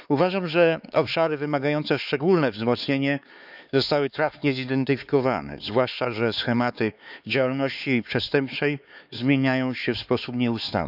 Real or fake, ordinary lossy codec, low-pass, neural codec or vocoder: fake; none; 5.4 kHz; codec, 16 kHz, 4 kbps, X-Codec, HuBERT features, trained on LibriSpeech